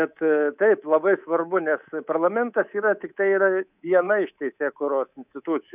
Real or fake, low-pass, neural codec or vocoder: fake; 3.6 kHz; codec, 24 kHz, 3.1 kbps, DualCodec